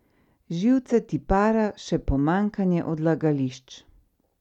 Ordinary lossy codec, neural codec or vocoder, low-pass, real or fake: none; none; 19.8 kHz; real